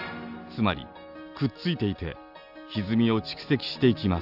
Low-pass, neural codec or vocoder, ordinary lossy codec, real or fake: 5.4 kHz; none; AAC, 48 kbps; real